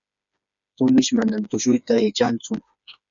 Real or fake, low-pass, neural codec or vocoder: fake; 7.2 kHz; codec, 16 kHz, 4 kbps, FreqCodec, smaller model